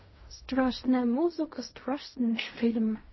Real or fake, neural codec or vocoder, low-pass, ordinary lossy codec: fake; codec, 16 kHz in and 24 kHz out, 0.4 kbps, LongCat-Audio-Codec, fine tuned four codebook decoder; 7.2 kHz; MP3, 24 kbps